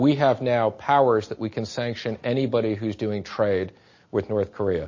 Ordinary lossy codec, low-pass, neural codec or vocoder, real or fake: MP3, 32 kbps; 7.2 kHz; none; real